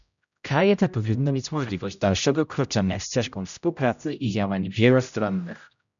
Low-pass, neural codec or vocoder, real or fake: 7.2 kHz; codec, 16 kHz, 0.5 kbps, X-Codec, HuBERT features, trained on general audio; fake